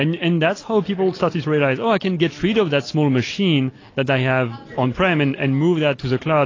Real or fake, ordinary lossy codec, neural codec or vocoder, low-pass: real; AAC, 32 kbps; none; 7.2 kHz